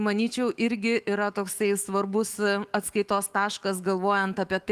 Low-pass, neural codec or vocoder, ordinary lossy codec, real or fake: 14.4 kHz; autoencoder, 48 kHz, 128 numbers a frame, DAC-VAE, trained on Japanese speech; Opus, 24 kbps; fake